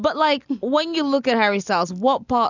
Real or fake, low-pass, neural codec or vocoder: real; 7.2 kHz; none